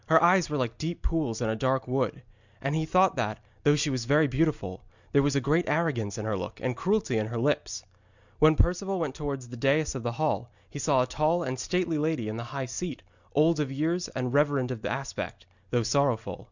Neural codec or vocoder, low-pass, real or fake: none; 7.2 kHz; real